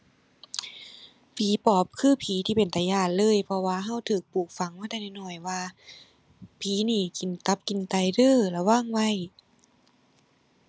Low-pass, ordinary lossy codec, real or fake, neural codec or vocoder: none; none; real; none